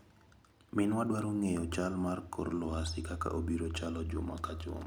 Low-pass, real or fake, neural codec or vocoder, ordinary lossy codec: none; real; none; none